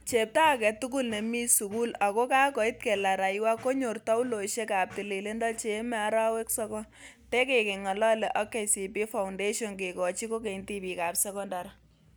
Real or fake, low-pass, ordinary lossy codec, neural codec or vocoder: real; none; none; none